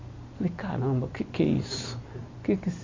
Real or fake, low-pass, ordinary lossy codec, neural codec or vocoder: fake; 7.2 kHz; MP3, 48 kbps; vocoder, 44.1 kHz, 128 mel bands every 256 samples, BigVGAN v2